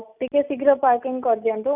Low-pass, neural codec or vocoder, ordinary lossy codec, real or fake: 3.6 kHz; none; none; real